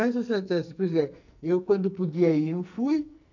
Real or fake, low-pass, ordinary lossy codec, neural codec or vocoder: fake; 7.2 kHz; none; codec, 44.1 kHz, 2.6 kbps, SNAC